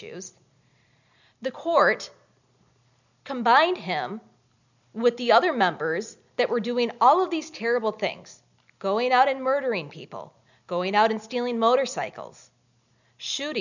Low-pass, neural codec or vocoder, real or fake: 7.2 kHz; none; real